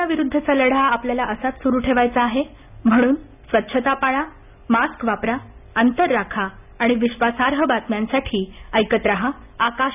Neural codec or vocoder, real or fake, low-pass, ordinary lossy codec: none; real; 3.6 kHz; none